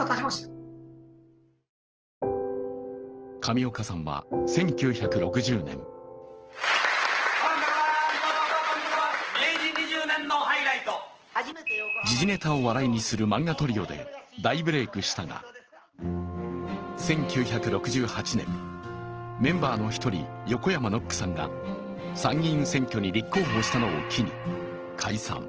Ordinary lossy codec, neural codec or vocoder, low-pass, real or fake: Opus, 16 kbps; none; 7.2 kHz; real